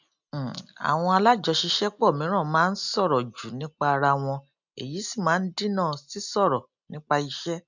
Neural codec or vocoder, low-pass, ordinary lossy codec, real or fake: none; 7.2 kHz; none; real